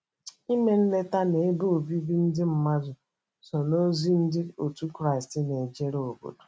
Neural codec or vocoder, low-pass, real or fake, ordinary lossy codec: none; none; real; none